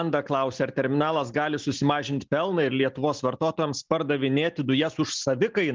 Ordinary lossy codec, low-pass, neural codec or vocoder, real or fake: Opus, 24 kbps; 7.2 kHz; none; real